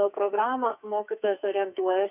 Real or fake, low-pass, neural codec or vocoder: fake; 3.6 kHz; codec, 44.1 kHz, 2.6 kbps, SNAC